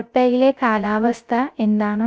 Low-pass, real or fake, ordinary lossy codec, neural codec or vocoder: none; fake; none; codec, 16 kHz, 0.3 kbps, FocalCodec